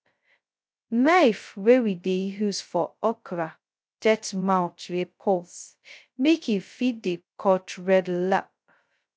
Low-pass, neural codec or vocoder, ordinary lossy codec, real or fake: none; codec, 16 kHz, 0.2 kbps, FocalCodec; none; fake